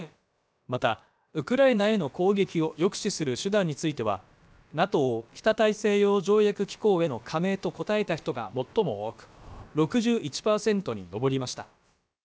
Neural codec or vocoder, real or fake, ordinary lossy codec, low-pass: codec, 16 kHz, about 1 kbps, DyCAST, with the encoder's durations; fake; none; none